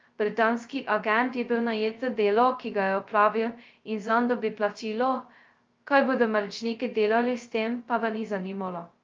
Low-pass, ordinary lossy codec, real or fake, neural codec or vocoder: 7.2 kHz; Opus, 24 kbps; fake; codec, 16 kHz, 0.2 kbps, FocalCodec